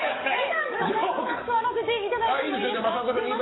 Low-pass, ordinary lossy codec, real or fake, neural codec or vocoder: 7.2 kHz; AAC, 16 kbps; fake; codec, 44.1 kHz, 7.8 kbps, DAC